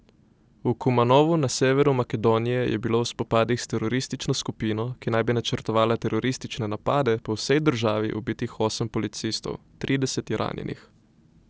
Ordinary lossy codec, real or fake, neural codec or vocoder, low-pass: none; real; none; none